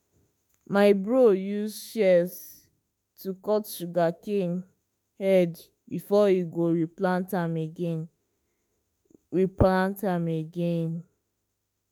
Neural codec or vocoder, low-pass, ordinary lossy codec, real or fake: autoencoder, 48 kHz, 32 numbers a frame, DAC-VAE, trained on Japanese speech; none; none; fake